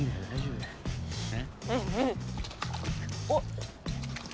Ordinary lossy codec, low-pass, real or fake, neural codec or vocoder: none; none; real; none